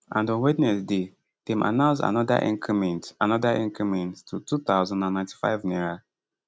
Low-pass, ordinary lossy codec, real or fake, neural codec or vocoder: none; none; real; none